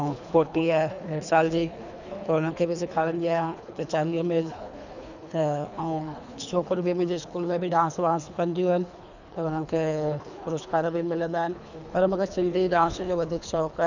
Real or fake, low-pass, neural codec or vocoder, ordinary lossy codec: fake; 7.2 kHz; codec, 24 kHz, 3 kbps, HILCodec; none